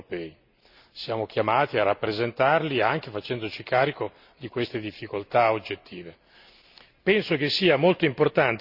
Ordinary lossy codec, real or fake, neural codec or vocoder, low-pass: Opus, 64 kbps; real; none; 5.4 kHz